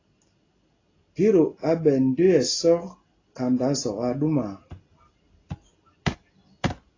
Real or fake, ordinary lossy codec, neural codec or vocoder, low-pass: real; AAC, 32 kbps; none; 7.2 kHz